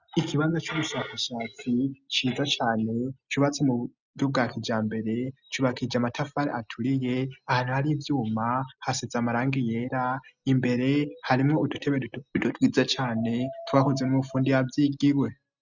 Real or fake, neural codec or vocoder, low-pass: real; none; 7.2 kHz